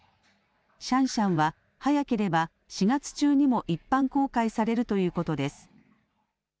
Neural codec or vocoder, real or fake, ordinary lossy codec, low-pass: none; real; none; none